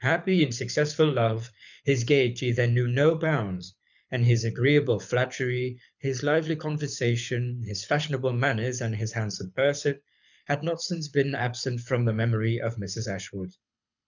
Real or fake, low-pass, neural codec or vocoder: fake; 7.2 kHz; codec, 24 kHz, 6 kbps, HILCodec